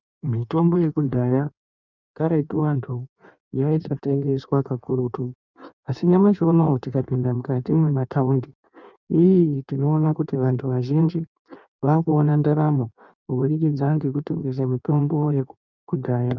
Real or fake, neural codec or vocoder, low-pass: fake; codec, 16 kHz in and 24 kHz out, 1.1 kbps, FireRedTTS-2 codec; 7.2 kHz